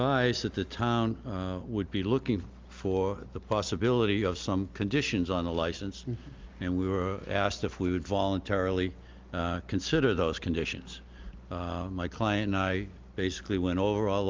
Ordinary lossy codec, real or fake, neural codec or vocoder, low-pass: Opus, 32 kbps; real; none; 7.2 kHz